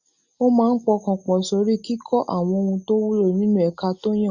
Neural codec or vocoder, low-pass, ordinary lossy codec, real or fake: none; 7.2 kHz; Opus, 64 kbps; real